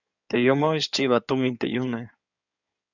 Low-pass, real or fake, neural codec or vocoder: 7.2 kHz; fake; codec, 16 kHz in and 24 kHz out, 2.2 kbps, FireRedTTS-2 codec